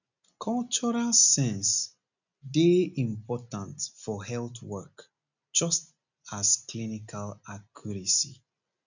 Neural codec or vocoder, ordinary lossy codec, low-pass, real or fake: none; none; 7.2 kHz; real